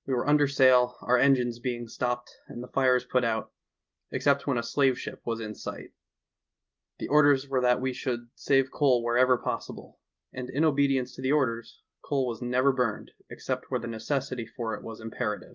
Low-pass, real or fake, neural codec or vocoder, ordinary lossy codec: 7.2 kHz; real; none; Opus, 32 kbps